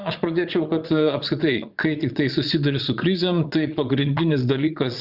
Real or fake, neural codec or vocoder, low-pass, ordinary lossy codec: fake; vocoder, 22.05 kHz, 80 mel bands, Vocos; 5.4 kHz; Opus, 64 kbps